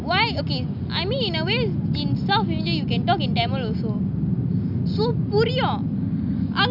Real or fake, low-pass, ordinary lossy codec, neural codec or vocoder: real; 5.4 kHz; none; none